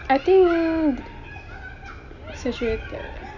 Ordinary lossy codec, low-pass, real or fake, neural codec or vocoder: none; 7.2 kHz; real; none